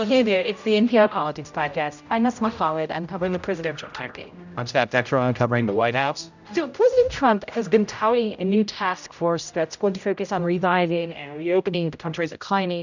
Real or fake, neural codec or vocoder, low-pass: fake; codec, 16 kHz, 0.5 kbps, X-Codec, HuBERT features, trained on general audio; 7.2 kHz